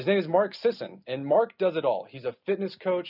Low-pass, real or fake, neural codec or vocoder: 5.4 kHz; real; none